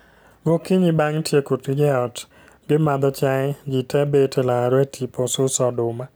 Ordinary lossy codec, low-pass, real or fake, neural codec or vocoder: none; none; real; none